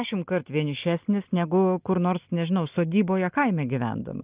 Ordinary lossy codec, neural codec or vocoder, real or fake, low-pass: Opus, 32 kbps; none; real; 3.6 kHz